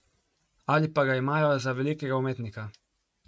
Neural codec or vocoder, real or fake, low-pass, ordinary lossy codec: none; real; none; none